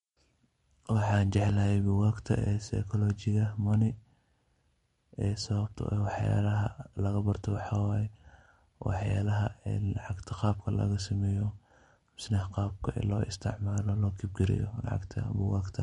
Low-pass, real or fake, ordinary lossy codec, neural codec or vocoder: 19.8 kHz; real; MP3, 48 kbps; none